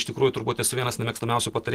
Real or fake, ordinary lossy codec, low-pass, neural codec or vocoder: real; Opus, 16 kbps; 14.4 kHz; none